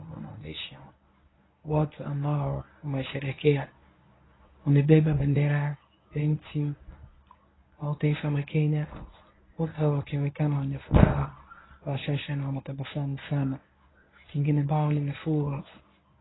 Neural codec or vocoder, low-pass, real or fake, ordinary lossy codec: codec, 24 kHz, 0.9 kbps, WavTokenizer, medium speech release version 1; 7.2 kHz; fake; AAC, 16 kbps